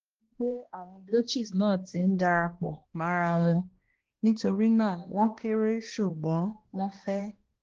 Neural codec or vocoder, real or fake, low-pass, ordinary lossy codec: codec, 16 kHz, 1 kbps, X-Codec, HuBERT features, trained on balanced general audio; fake; 7.2 kHz; Opus, 16 kbps